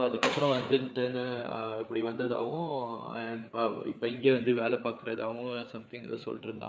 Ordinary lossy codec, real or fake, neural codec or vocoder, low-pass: none; fake; codec, 16 kHz, 4 kbps, FreqCodec, larger model; none